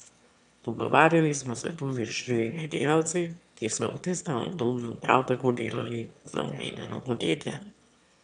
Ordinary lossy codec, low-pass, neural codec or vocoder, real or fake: none; 9.9 kHz; autoencoder, 22.05 kHz, a latent of 192 numbers a frame, VITS, trained on one speaker; fake